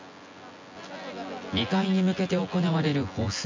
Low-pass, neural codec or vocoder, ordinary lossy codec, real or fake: 7.2 kHz; vocoder, 24 kHz, 100 mel bands, Vocos; MP3, 64 kbps; fake